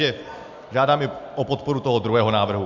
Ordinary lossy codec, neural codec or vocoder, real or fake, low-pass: MP3, 64 kbps; none; real; 7.2 kHz